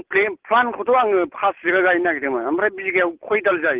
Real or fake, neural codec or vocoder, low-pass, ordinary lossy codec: real; none; 3.6 kHz; Opus, 16 kbps